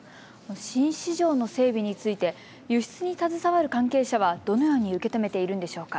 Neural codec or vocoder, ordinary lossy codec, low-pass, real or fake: none; none; none; real